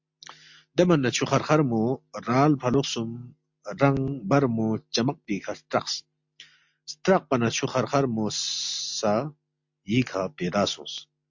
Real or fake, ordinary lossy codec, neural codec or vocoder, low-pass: real; MP3, 48 kbps; none; 7.2 kHz